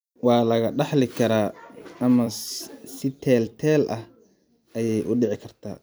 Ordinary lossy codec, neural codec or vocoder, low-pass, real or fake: none; vocoder, 44.1 kHz, 128 mel bands every 256 samples, BigVGAN v2; none; fake